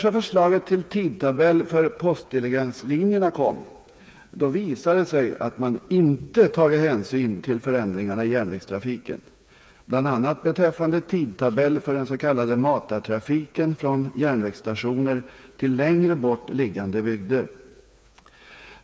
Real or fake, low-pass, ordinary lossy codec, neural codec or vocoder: fake; none; none; codec, 16 kHz, 4 kbps, FreqCodec, smaller model